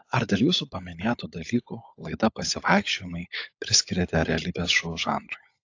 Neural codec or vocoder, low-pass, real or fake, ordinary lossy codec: codec, 16 kHz, 16 kbps, FunCodec, trained on LibriTTS, 50 frames a second; 7.2 kHz; fake; AAC, 48 kbps